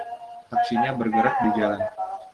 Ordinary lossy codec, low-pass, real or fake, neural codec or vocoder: Opus, 16 kbps; 10.8 kHz; real; none